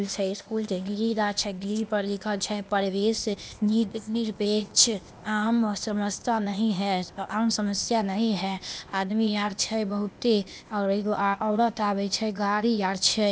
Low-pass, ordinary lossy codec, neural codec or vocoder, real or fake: none; none; codec, 16 kHz, 0.8 kbps, ZipCodec; fake